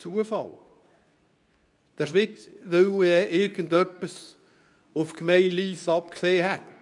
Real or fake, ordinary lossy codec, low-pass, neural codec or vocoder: fake; none; 10.8 kHz; codec, 24 kHz, 0.9 kbps, WavTokenizer, medium speech release version 2